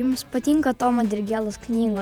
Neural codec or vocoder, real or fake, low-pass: vocoder, 48 kHz, 128 mel bands, Vocos; fake; 19.8 kHz